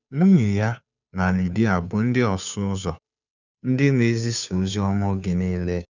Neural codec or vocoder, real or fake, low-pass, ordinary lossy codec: codec, 16 kHz, 2 kbps, FunCodec, trained on Chinese and English, 25 frames a second; fake; 7.2 kHz; none